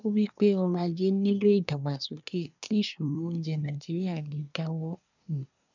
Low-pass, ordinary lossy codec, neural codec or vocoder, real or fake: 7.2 kHz; none; codec, 24 kHz, 1 kbps, SNAC; fake